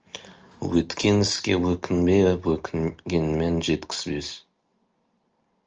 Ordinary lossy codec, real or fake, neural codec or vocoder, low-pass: Opus, 24 kbps; real; none; 7.2 kHz